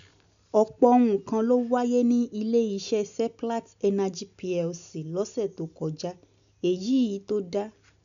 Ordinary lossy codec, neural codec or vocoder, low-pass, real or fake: MP3, 96 kbps; none; 7.2 kHz; real